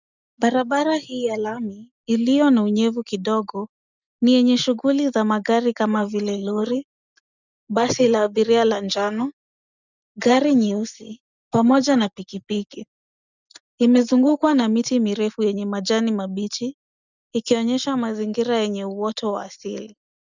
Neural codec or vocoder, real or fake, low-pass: none; real; 7.2 kHz